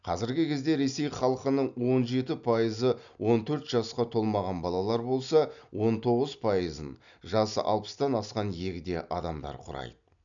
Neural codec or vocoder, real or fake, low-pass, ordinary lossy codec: none; real; 7.2 kHz; none